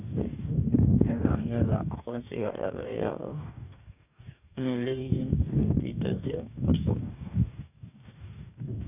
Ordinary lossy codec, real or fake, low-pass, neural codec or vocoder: none; fake; 3.6 kHz; codec, 44.1 kHz, 2.6 kbps, DAC